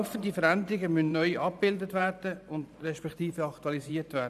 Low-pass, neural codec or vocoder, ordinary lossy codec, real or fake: 14.4 kHz; vocoder, 44.1 kHz, 128 mel bands every 512 samples, BigVGAN v2; none; fake